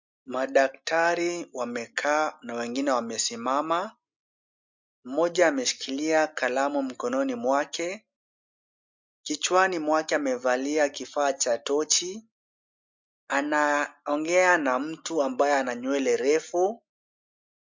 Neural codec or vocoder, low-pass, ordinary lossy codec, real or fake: none; 7.2 kHz; MP3, 64 kbps; real